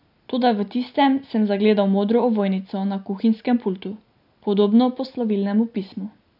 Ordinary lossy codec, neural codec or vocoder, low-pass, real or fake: none; none; 5.4 kHz; real